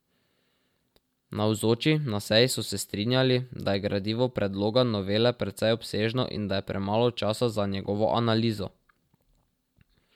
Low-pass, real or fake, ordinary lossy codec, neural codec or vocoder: 19.8 kHz; real; MP3, 96 kbps; none